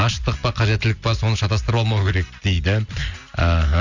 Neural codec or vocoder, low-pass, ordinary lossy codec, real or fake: none; 7.2 kHz; none; real